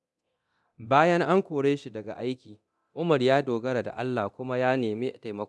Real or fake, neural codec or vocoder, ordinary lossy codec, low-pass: fake; codec, 24 kHz, 0.9 kbps, DualCodec; none; none